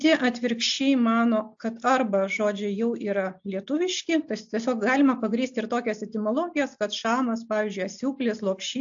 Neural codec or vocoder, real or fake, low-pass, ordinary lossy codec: none; real; 7.2 kHz; AAC, 64 kbps